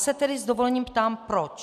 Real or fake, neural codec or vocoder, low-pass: real; none; 14.4 kHz